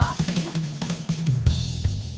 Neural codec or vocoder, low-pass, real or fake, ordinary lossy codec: codec, 16 kHz, 2 kbps, FunCodec, trained on Chinese and English, 25 frames a second; none; fake; none